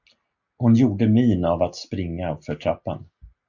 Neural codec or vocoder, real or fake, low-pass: none; real; 7.2 kHz